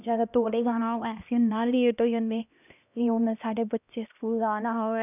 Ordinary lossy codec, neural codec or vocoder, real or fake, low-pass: none; codec, 16 kHz, 1 kbps, X-Codec, HuBERT features, trained on LibriSpeech; fake; 3.6 kHz